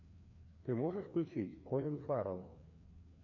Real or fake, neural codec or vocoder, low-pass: fake; codec, 16 kHz, 2 kbps, FreqCodec, larger model; 7.2 kHz